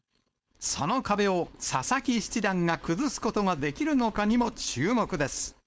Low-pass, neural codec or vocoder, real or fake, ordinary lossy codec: none; codec, 16 kHz, 4.8 kbps, FACodec; fake; none